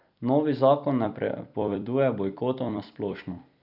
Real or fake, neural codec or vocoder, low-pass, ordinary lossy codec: fake; vocoder, 44.1 kHz, 128 mel bands every 256 samples, BigVGAN v2; 5.4 kHz; none